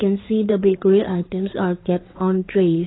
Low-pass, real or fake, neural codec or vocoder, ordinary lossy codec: 7.2 kHz; fake; codec, 16 kHz in and 24 kHz out, 2.2 kbps, FireRedTTS-2 codec; AAC, 16 kbps